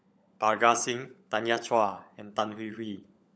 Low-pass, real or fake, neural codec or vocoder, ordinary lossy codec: none; fake; codec, 16 kHz, 16 kbps, FunCodec, trained on LibriTTS, 50 frames a second; none